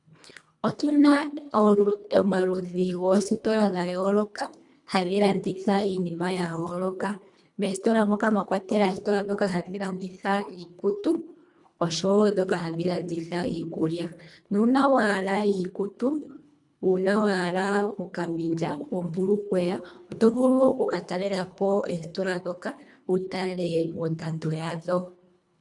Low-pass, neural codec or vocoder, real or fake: 10.8 kHz; codec, 24 kHz, 1.5 kbps, HILCodec; fake